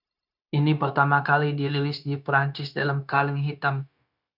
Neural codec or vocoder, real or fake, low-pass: codec, 16 kHz, 0.9 kbps, LongCat-Audio-Codec; fake; 5.4 kHz